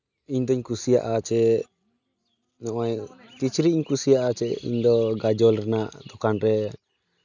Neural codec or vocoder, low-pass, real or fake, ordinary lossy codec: none; 7.2 kHz; real; none